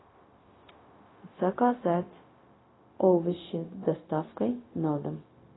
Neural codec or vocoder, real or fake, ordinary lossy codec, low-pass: codec, 16 kHz, 0.4 kbps, LongCat-Audio-Codec; fake; AAC, 16 kbps; 7.2 kHz